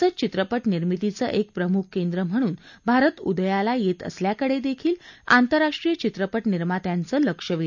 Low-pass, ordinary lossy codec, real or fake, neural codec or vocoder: 7.2 kHz; none; real; none